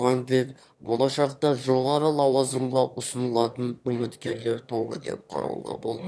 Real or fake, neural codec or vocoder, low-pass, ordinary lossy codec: fake; autoencoder, 22.05 kHz, a latent of 192 numbers a frame, VITS, trained on one speaker; none; none